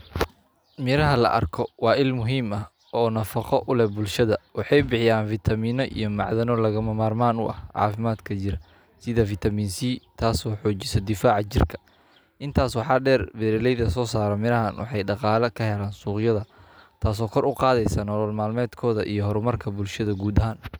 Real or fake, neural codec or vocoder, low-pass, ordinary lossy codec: real; none; none; none